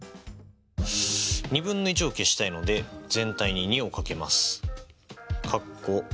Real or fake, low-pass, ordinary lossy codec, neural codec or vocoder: real; none; none; none